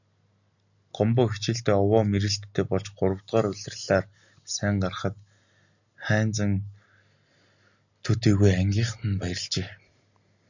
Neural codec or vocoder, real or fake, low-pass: none; real; 7.2 kHz